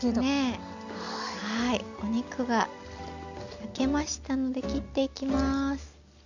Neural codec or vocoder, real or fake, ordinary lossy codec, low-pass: none; real; none; 7.2 kHz